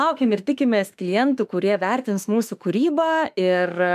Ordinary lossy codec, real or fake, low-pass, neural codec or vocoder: MP3, 96 kbps; fake; 14.4 kHz; autoencoder, 48 kHz, 32 numbers a frame, DAC-VAE, trained on Japanese speech